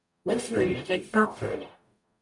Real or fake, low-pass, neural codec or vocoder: fake; 10.8 kHz; codec, 44.1 kHz, 0.9 kbps, DAC